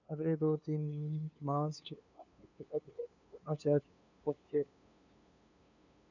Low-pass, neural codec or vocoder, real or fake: 7.2 kHz; codec, 16 kHz, 2 kbps, FunCodec, trained on LibriTTS, 25 frames a second; fake